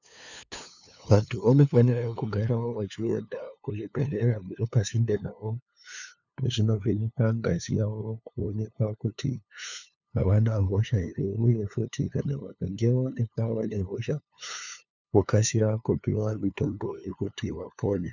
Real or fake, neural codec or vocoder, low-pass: fake; codec, 16 kHz, 2 kbps, FunCodec, trained on LibriTTS, 25 frames a second; 7.2 kHz